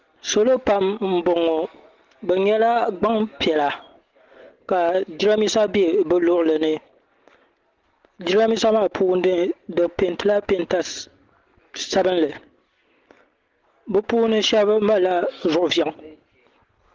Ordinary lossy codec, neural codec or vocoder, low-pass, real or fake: Opus, 16 kbps; none; 7.2 kHz; real